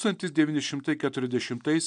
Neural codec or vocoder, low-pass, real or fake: none; 9.9 kHz; real